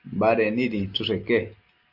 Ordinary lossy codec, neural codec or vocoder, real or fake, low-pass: Opus, 32 kbps; none; real; 5.4 kHz